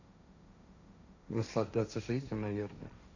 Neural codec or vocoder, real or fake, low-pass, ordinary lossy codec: codec, 16 kHz, 1.1 kbps, Voila-Tokenizer; fake; 7.2 kHz; none